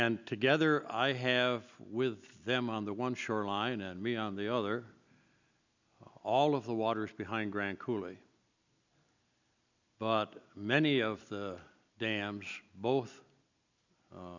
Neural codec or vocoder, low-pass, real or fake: none; 7.2 kHz; real